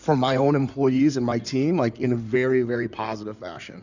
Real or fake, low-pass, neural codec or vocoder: fake; 7.2 kHz; codec, 16 kHz in and 24 kHz out, 2.2 kbps, FireRedTTS-2 codec